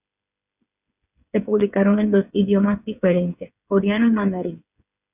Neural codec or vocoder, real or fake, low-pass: codec, 16 kHz, 8 kbps, FreqCodec, smaller model; fake; 3.6 kHz